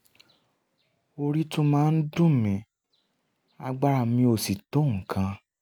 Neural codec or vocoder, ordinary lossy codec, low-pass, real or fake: none; none; none; real